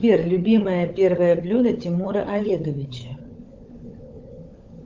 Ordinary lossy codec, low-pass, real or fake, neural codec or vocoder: Opus, 32 kbps; 7.2 kHz; fake; codec, 16 kHz, 16 kbps, FunCodec, trained on LibriTTS, 50 frames a second